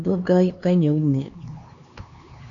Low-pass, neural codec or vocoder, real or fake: 7.2 kHz; codec, 16 kHz, 2 kbps, X-Codec, HuBERT features, trained on LibriSpeech; fake